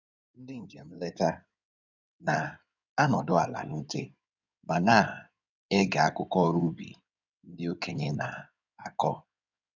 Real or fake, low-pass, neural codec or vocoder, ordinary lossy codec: fake; 7.2 kHz; codec, 16 kHz in and 24 kHz out, 2.2 kbps, FireRedTTS-2 codec; none